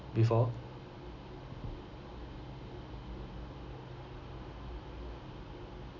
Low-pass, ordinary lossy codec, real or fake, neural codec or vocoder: 7.2 kHz; none; real; none